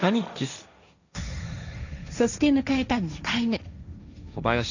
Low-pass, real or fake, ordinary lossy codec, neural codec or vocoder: 7.2 kHz; fake; none; codec, 16 kHz, 1.1 kbps, Voila-Tokenizer